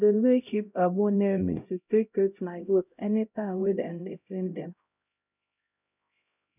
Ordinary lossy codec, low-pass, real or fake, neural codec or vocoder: none; 3.6 kHz; fake; codec, 16 kHz, 0.5 kbps, X-Codec, HuBERT features, trained on LibriSpeech